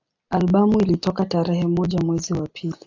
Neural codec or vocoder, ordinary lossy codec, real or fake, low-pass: none; AAC, 48 kbps; real; 7.2 kHz